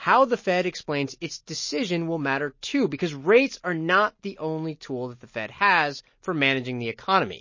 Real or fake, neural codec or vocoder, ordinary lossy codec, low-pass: real; none; MP3, 32 kbps; 7.2 kHz